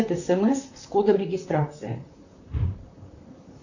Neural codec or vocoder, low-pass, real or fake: vocoder, 44.1 kHz, 128 mel bands, Pupu-Vocoder; 7.2 kHz; fake